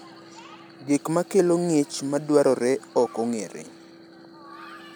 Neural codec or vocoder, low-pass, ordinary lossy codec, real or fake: none; none; none; real